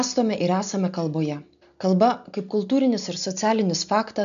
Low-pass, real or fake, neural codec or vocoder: 7.2 kHz; real; none